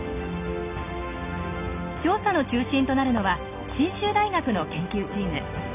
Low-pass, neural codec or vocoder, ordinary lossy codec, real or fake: 3.6 kHz; none; none; real